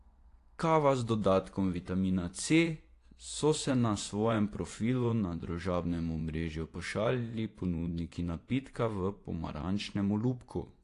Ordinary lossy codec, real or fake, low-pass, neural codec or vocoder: AAC, 48 kbps; fake; 10.8 kHz; vocoder, 24 kHz, 100 mel bands, Vocos